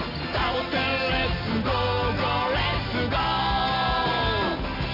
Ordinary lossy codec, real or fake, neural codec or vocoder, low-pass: none; real; none; 5.4 kHz